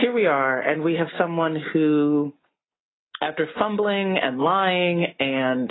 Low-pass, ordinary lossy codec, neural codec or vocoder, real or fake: 7.2 kHz; AAC, 16 kbps; none; real